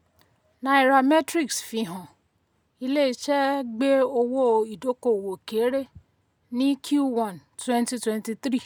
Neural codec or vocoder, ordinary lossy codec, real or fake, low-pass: none; none; real; none